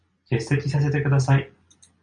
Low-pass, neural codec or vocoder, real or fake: 9.9 kHz; none; real